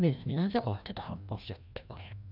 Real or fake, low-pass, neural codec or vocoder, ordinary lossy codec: fake; 5.4 kHz; codec, 16 kHz, 1 kbps, FreqCodec, larger model; none